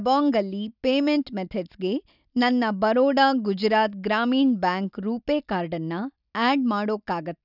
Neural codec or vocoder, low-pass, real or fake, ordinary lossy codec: none; 5.4 kHz; real; none